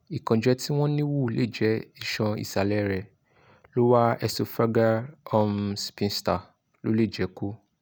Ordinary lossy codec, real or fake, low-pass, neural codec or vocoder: none; real; none; none